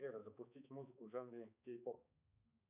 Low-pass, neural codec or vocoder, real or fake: 3.6 kHz; codec, 16 kHz, 4 kbps, X-Codec, HuBERT features, trained on balanced general audio; fake